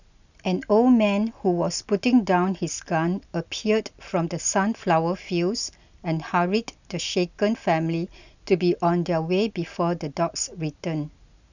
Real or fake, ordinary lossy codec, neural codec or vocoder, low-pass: real; none; none; 7.2 kHz